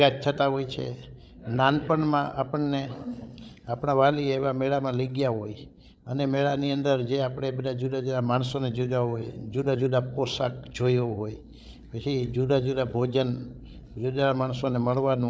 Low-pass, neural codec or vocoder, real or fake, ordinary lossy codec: none; codec, 16 kHz, 8 kbps, FreqCodec, larger model; fake; none